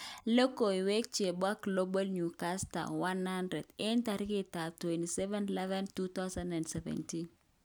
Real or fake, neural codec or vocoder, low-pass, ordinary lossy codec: real; none; none; none